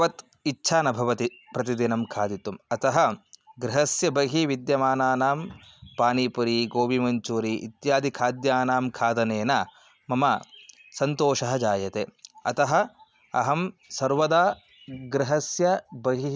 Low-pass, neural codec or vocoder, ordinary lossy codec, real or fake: none; none; none; real